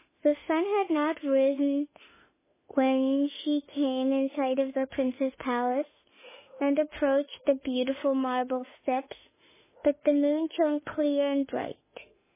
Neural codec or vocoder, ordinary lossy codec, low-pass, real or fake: autoencoder, 48 kHz, 32 numbers a frame, DAC-VAE, trained on Japanese speech; MP3, 16 kbps; 3.6 kHz; fake